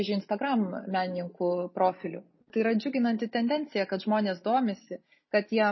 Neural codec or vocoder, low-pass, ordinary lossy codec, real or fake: none; 7.2 kHz; MP3, 24 kbps; real